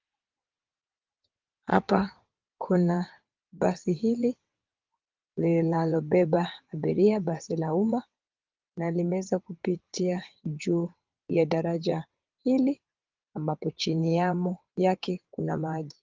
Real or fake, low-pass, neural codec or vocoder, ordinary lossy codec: fake; 7.2 kHz; vocoder, 22.05 kHz, 80 mel bands, WaveNeXt; Opus, 32 kbps